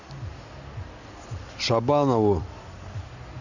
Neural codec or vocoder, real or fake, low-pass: none; real; 7.2 kHz